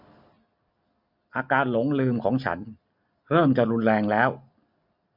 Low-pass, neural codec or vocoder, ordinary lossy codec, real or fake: 5.4 kHz; none; none; real